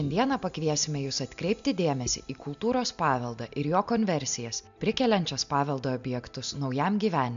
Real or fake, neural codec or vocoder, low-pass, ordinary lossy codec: real; none; 7.2 kHz; MP3, 64 kbps